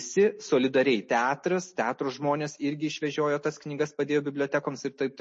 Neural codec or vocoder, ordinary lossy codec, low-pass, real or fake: none; MP3, 32 kbps; 10.8 kHz; real